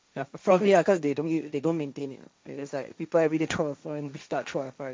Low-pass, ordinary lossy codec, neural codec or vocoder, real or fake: none; none; codec, 16 kHz, 1.1 kbps, Voila-Tokenizer; fake